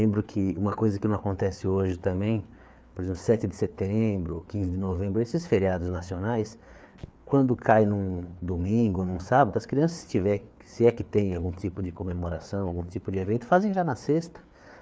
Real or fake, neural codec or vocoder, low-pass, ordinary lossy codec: fake; codec, 16 kHz, 4 kbps, FreqCodec, larger model; none; none